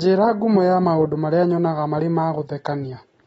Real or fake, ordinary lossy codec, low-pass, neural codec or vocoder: real; AAC, 24 kbps; 10.8 kHz; none